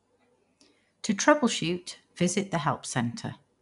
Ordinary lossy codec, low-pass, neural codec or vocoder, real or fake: none; 10.8 kHz; none; real